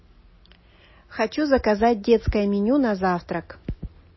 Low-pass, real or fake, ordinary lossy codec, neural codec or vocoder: 7.2 kHz; real; MP3, 24 kbps; none